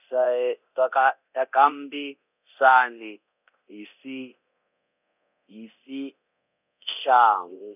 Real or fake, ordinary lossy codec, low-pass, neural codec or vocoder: fake; none; 3.6 kHz; codec, 24 kHz, 0.9 kbps, DualCodec